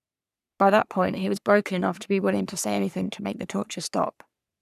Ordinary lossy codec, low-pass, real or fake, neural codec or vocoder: none; 14.4 kHz; fake; codec, 44.1 kHz, 3.4 kbps, Pupu-Codec